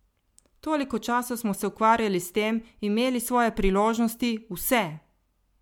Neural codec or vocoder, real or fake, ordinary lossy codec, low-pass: none; real; MP3, 96 kbps; 19.8 kHz